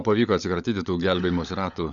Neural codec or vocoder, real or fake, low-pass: codec, 16 kHz, 16 kbps, FunCodec, trained on LibriTTS, 50 frames a second; fake; 7.2 kHz